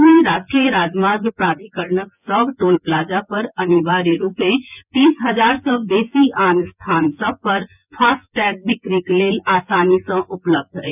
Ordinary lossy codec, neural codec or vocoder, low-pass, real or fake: none; vocoder, 24 kHz, 100 mel bands, Vocos; 3.6 kHz; fake